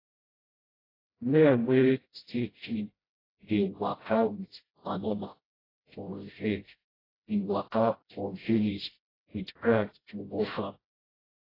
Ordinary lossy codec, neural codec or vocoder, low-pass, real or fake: AAC, 24 kbps; codec, 16 kHz, 0.5 kbps, FreqCodec, smaller model; 5.4 kHz; fake